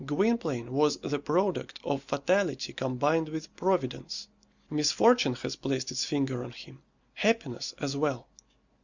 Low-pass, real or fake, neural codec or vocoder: 7.2 kHz; real; none